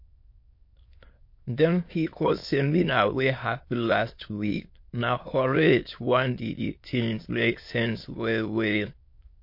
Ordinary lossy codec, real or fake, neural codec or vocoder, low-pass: MP3, 32 kbps; fake; autoencoder, 22.05 kHz, a latent of 192 numbers a frame, VITS, trained on many speakers; 5.4 kHz